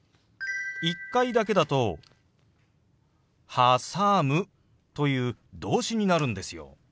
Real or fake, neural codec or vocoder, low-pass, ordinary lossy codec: real; none; none; none